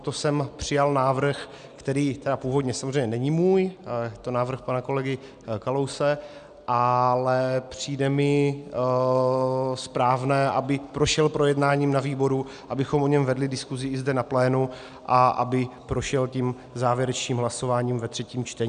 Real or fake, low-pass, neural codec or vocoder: real; 9.9 kHz; none